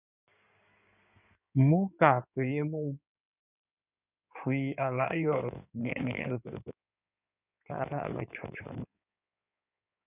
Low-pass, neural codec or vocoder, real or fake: 3.6 kHz; codec, 16 kHz in and 24 kHz out, 2.2 kbps, FireRedTTS-2 codec; fake